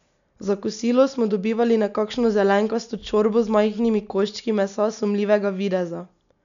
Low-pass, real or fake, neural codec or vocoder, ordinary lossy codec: 7.2 kHz; real; none; none